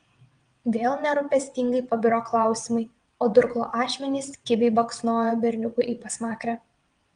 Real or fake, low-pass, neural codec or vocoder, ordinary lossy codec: fake; 9.9 kHz; vocoder, 22.05 kHz, 80 mel bands, WaveNeXt; Opus, 32 kbps